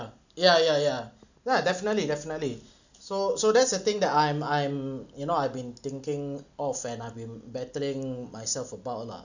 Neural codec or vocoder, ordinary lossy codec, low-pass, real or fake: none; none; 7.2 kHz; real